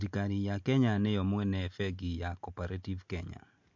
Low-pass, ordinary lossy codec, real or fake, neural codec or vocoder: 7.2 kHz; MP3, 48 kbps; real; none